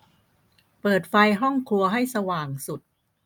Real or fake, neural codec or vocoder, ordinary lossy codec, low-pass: real; none; none; none